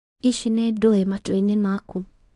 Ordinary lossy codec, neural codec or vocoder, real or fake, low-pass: AAC, 48 kbps; codec, 24 kHz, 0.9 kbps, WavTokenizer, small release; fake; 10.8 kHz